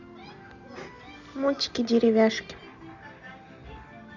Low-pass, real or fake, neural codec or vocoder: 7.2 kHz; real; none